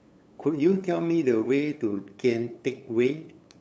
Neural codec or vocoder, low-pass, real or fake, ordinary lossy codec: codec, 16 kHz, 8 kbps, FunCodec, trained on LibriTTS, 25 frames a second; none; fake; none